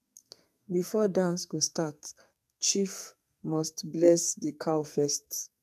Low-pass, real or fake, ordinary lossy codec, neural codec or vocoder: 14.4 kHz; fake; none; codec, 44.1 kHz, 2.6 kbps, SNAC